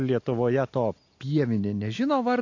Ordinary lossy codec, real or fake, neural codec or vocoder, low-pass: AAC, 48 kbps; real; none; 7.2 kHz